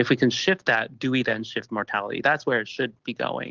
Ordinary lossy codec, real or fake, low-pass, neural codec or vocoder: Opus, 16 kbps; real; 7.2 kHz; none